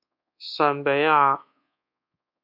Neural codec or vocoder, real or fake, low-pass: codec, 24 kHz, 1.2 kbps, DualCodec; fake; 5.4 kHz